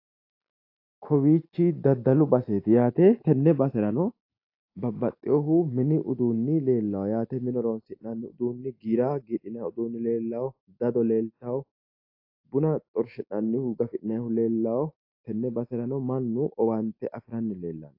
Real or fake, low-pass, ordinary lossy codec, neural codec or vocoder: real; 5.4 kHz; AAC, 32 kbps; none